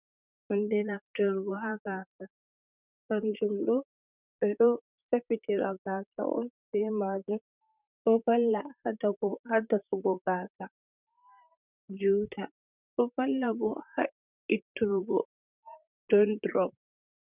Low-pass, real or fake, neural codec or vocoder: 3.6 kHz; fake; vocoder, 44.1 kHz, 128 mel bands, Pupu-Vocoder